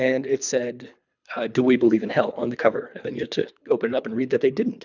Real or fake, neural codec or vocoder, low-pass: fake; codec, 24 kHz, 3 kbps, HILCodec; 7.2 kHz